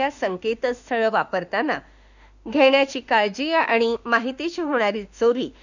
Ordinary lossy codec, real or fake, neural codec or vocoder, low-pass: none; fake; autoencoder, 48 kHz, 32 numbers a frame, DAC-VAE, trained on Japanese speech; 7.2 kHz